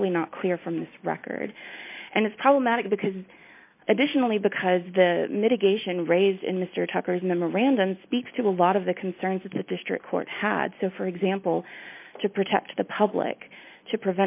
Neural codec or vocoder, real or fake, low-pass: none; real; 3.6 kHz